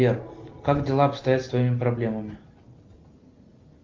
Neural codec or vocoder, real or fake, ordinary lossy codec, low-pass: none; real; Opus, 24 kbps; 7.2 kHz